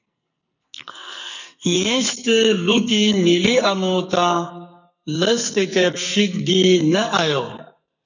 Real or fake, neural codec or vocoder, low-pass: fake; codec, 44.1 kHz, 2.6 kbps, SNAC; 7.2 kHz